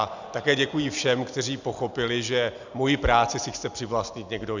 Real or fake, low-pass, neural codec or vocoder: real; 7.2 kHz; none